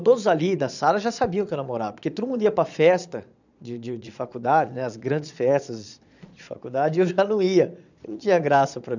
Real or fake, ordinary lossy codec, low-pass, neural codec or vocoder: fake; none; 7.2 kHz; vocoder, 22.05 kHz, 80 mel bands, Vocos